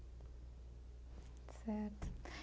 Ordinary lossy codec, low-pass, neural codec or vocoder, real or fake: none; none; none; real